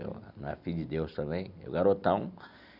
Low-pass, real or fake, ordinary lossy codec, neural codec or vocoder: 5.4 kHz; real; none; none